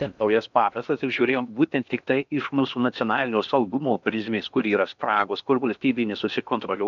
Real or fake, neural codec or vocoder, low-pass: fake; codec, 16 kHz in and 24 kHz out, 0.8 kbps, FocalCodec, streaming, 65536 codes; 7.2 kHz